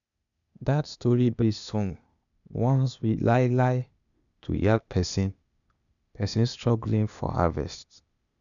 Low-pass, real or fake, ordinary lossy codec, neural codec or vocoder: 7.2 kHz; fake; none; codec, 16 kHz, 0.8 kbps, ZipCodec